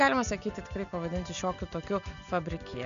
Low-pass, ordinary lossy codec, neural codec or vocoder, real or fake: 7.2 kHz; MP3, 96 kbps; none; real